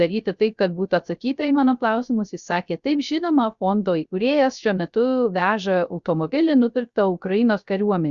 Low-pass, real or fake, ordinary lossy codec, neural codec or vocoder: 7.2 kHz; fake; Opus, 64 kbps; codec, 16 kHz, 0.3 kbps, FocalCodec